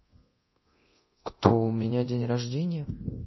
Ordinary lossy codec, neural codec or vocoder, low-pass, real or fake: MP3, 24 kbps; codec, 24 kHz, 0.9 kbps, DualCodec; 7.2 kHz; fake